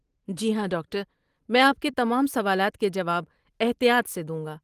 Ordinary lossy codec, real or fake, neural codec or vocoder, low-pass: Opus, 24 kbps; real; none; 14.4 kHz